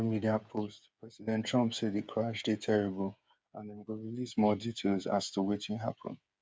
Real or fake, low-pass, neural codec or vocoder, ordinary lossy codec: fake; none; codec, 16 kHz, 8 kbps, FreqCodec, smaller model; none